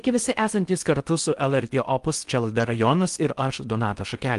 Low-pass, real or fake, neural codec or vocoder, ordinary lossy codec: 10.8 kHz; fake; codec, 16 kHz in and 24 kHz out, 0.6 kbps, FocalCodec, streaming, 4096 codes; Opus, 32 kbps